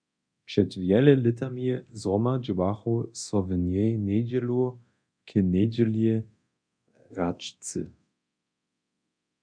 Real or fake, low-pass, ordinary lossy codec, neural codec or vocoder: fake; 9.9 kHz; MP3, 96 kbps; codec, 24 kHz, 0.9 kbps, DualCodec